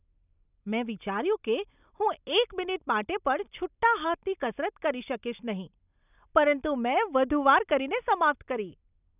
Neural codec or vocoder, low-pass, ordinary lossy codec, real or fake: none; 3.6 kHz; none; real